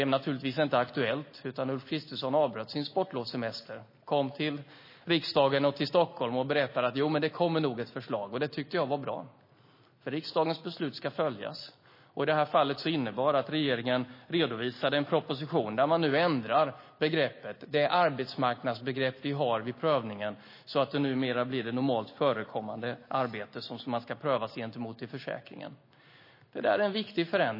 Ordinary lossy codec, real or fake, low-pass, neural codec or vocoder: MP3, 24 kbps; real; 5.4 kHz; none